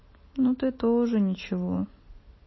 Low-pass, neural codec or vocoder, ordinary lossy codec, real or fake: 7.2 kHz; none; MP3, 24 kbps; real